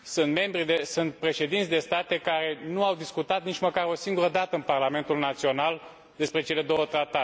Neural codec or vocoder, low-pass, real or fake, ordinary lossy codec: none; none; real; none